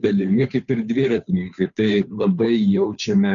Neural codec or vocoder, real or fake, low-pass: codec, 16 kHz, 2 kbps, FunCodec, trained on Chinese and English, 25 frames a second; fake; 7.2 kHz